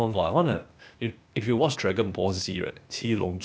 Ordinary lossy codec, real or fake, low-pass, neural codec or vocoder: none; fake; none; codec, 16 kHz, 0.8 kbps, ZipCodec